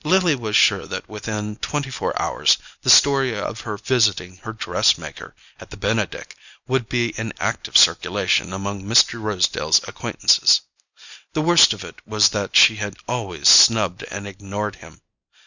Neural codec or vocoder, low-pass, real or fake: none; 7.2 kHz; real